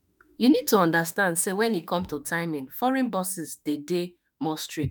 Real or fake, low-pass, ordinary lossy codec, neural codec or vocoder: fake; none; none; autoencoder, 48 kHz, 32 numbers a frame, DAC-VAE, trained on Japanese speech